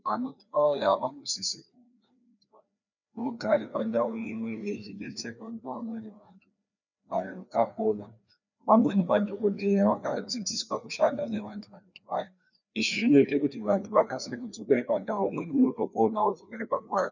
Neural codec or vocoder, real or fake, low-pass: codec, 16 kHz, 2 kbps, FreqCodec, larger model; fake; 7.2 kHz